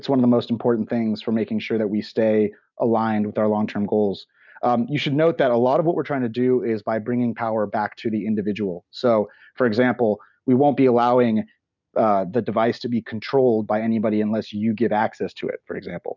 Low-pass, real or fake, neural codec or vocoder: 7.2 kHz; real; none